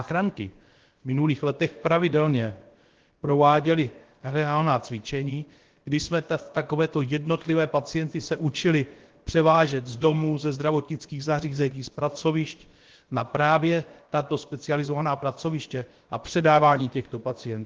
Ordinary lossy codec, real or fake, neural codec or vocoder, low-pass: Opus, 16 kbps; fake; codec, 16 kHz, about 1 kbps, DyCAST, with the encoder's durations; 7.2 kHz